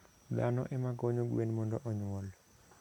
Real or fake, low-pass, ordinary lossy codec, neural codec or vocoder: real; 19.8 kHz; none; none